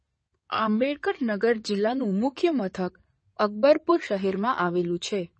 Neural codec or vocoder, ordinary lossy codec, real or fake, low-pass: codec, 16 kHz in and 24 kHz out, 2.2 kbps, FireRedTTS-2 codec; MP3, 32 kbps; fake; 9.9 kHz